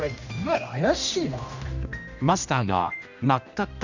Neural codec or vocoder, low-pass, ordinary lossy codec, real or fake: codec, 16 kHz, 1 kbps, X-Codec, HuBERT features, trained on general audio; 7.2 kHz; none; fake